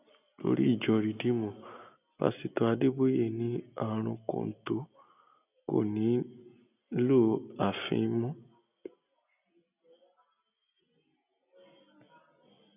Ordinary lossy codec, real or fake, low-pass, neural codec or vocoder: none; real; 3.6 kHz; none